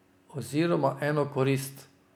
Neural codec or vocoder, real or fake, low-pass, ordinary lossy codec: none; real; 19.8 kHz; none